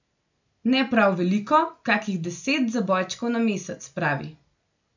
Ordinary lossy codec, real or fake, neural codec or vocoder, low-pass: none; real; none; 7.2 kHz